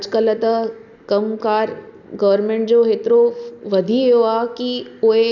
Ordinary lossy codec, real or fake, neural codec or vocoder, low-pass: none; real; none; 7.2 kHz